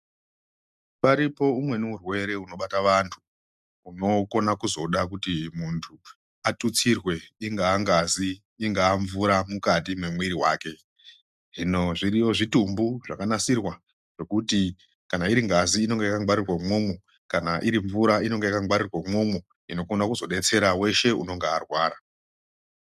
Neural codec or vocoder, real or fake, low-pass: none; real; 14.4 kHz